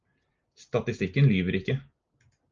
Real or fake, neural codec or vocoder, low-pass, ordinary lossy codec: real; none; 7.2 kHz; Opus, 24 kbps